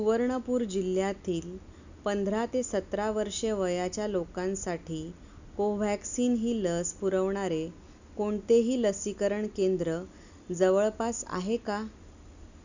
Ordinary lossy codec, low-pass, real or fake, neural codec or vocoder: none; 7.2 kHz; real; none